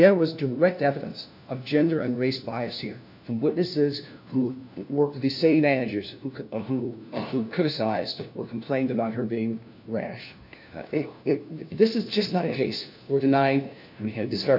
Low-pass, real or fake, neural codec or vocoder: 5.4 kHz; fake; codec, 16 kHz, 1 kbps, FunCodec, trained on LibriTTS, 50 frames a second